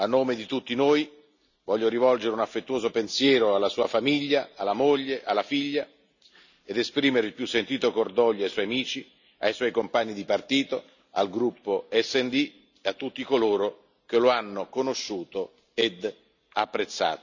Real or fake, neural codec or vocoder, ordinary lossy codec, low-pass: real; none; none; 7.2 kHz